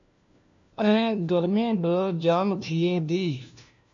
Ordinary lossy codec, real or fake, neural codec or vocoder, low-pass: MP3, 64 kbps; fake; codec, 16 kHz, 1 kbps, FunCodec, trained on LibriTTS, 50 frames a second; 7.2 kHz